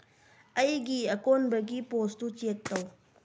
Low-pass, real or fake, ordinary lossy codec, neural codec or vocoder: none; real; none; none